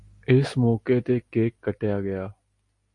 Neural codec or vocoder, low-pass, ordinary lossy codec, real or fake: none; 10.8 kHz; MP3, 48 kbps; real